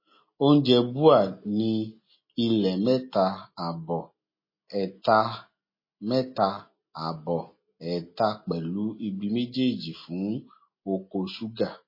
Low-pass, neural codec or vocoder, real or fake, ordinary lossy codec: 5.4 kHz; none; real; MP3, 24 kbps